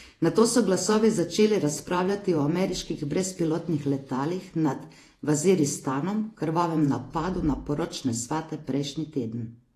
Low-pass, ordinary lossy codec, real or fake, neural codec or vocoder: 14.4 kHz; AAC, 48 kbps; fake; vocoder, 48 kHz, 128 mel bands, Vocos